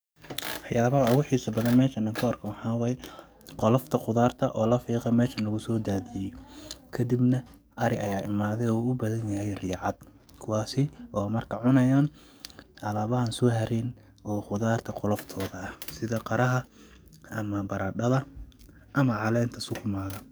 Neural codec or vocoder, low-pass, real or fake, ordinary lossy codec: codec, 44.1 kHz, 7.8 kbps, DAC; none; fake; none